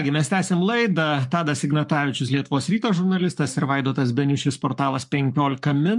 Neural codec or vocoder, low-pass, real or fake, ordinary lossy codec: codec, 44.1 kHz, 7.8 kbps, Pupu-Codec; 10.8 kHz; fake; MP3, 64 kbps